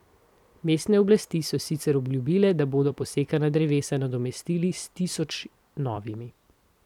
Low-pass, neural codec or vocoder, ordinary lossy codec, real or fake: 19.8 kHz; vocoder, 44.1 kHz, 128 mel bands every 256 samples, BigVGAN v2; none; fake